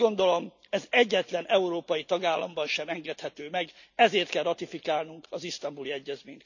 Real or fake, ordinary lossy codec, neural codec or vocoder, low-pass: real; none; none; 7.2 kHz